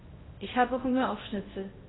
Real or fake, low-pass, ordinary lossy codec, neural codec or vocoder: fake; 7.2 kHz; AAC, 16 kbps; codec, 16 kHz in and 24 kHz out, 0.6 kbps, FocalCodec, streaming, 2048 codes